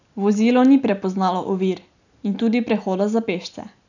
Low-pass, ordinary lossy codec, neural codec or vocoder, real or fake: 7.2 kHz; none; none; real